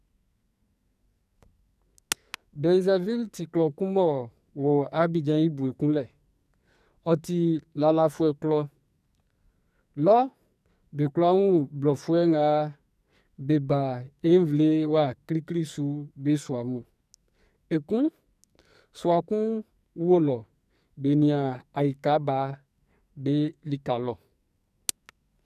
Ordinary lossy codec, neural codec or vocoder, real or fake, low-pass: none; codec, 44.1 kHz, 2.6 kbps, SNAC; fake; 14.4 kHz